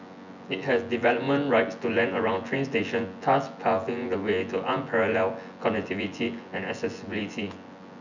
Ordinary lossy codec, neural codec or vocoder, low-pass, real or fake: none; vocoder, 24 kHz, 100 mel bands, Vocos; 7.2 kHz; fake